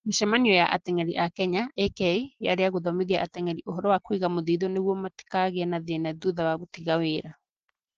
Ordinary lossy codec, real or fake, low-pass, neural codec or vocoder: Opus, 16 kbps; real; 7.2 kHz; none